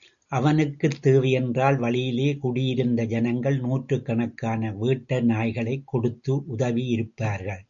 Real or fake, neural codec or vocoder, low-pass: real; none; 7.2 kHz